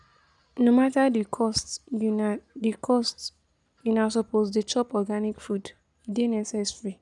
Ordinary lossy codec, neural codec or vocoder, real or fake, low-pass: none; none; real; 10.8 kHz